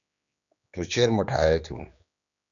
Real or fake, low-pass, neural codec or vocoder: fake; 7.2 kHz; codec, 16 kHz, 2 kbps, X-Codec, HuBERT features, trained on general audio